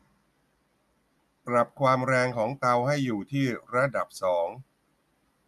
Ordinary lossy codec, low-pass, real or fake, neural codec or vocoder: none; 14.4 kHz; real; none